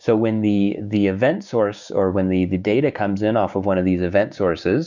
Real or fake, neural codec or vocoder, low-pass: fake; codec, 16 kHz, 6 kbps, DAC; 7.2 kHz